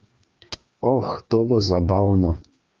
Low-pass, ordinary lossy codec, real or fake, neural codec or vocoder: 7.2 kHz; Opus, 32 kbps; fake; codec, 16 kHz, 2 kbps, FreqCodec, larger model